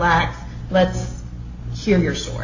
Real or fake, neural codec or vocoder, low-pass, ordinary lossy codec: real; none; 7.2 kHz; AAC, 48 kbps